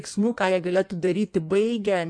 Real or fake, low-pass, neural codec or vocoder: fake; 9.9 kHz; codec, 16 kHz in and 24 kHz out, 1.1 kbps, FireRedTTS-2 codec